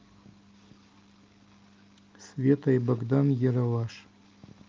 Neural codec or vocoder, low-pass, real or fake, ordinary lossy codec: none; 7.2 kHz; real; Opus, 32 kbps